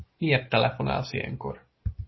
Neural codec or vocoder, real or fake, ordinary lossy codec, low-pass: none; real; MP3, 24 kbps; 7.2 kHz